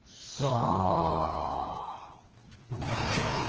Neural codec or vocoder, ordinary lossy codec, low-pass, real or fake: codec, 16 kHz, 2 kbps, FreqCodec, larger model; Opus, 16 kbps; 7.2 kHz; fake